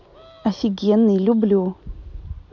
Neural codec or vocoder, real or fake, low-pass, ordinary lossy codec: none; real; 7.2 kHz; none